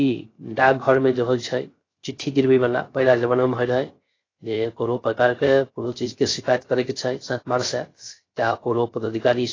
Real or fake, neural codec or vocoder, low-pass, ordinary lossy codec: fake; codec, 16 kHz, 0.3 kbps, FocalCodec; 7.2 kHz; AAC, 32 kbps